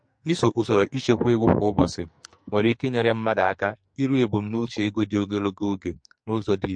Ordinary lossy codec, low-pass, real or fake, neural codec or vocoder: MP3, 48 kbps; 9.9 kHz; fake; codec, 44.1 kHz, 2.6 kbps, SNAC